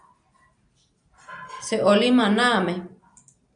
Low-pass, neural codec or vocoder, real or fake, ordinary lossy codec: 9.9 kHz; none; real; MP3, 96 kbps